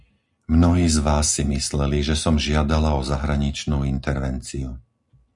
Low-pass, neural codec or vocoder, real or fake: 10.8 kHz; none; real